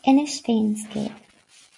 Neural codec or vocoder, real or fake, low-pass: none; real; 10.8 kHz